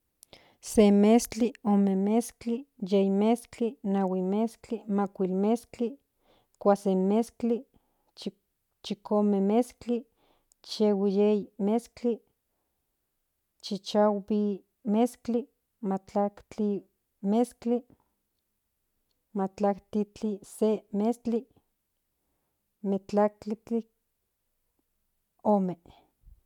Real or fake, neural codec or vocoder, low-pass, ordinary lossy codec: real; none; 19.8 kHz; none